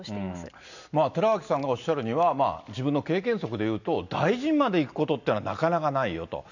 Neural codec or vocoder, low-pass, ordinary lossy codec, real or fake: none; 7.2 kHz; none; real